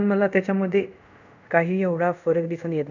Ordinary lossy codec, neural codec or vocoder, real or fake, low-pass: none; codec, 24 kHz, 0.5 kbps, DualCodec; fake; 7.2 kHz